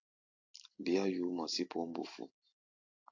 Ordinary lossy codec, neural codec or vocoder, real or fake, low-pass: AAC, 48 kbps; vocoder, 44.1 kHz, 128 mel bands every 256 samples, BigVGAN v2; fake; 7.2 kHz